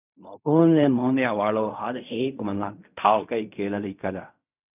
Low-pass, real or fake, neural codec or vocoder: 3.6 kHz; fake; codec, 16 kHz in and 24 kHz out, 0.4 kbps, LongCat-Audio-Codec, fine tuned four codebook decoder